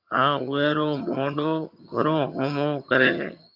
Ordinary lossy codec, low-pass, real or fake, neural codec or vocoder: MP3, 48 kbps; 5.4 kHz; fake; vocoder, 22.05 kHz, 80 mel bands, HiFi-GAN